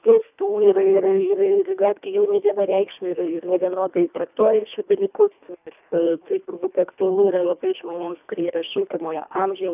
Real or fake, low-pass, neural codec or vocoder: fake; 3.6 kHz; codec, 24 kHz, 1.5 kbps, HILCodec